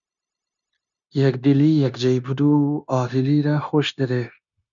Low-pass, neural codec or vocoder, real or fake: 7.2 kHz; codec, 16 kHz, 0.9 kbps, LongCat-Audio-Codec; fake